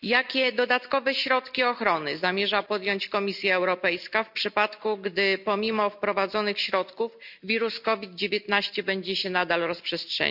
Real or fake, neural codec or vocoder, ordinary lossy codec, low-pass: real; none; none; 5.4 kHz